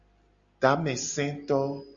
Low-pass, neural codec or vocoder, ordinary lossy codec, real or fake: 7.2 kHz; none; Opus, 64 kbps; real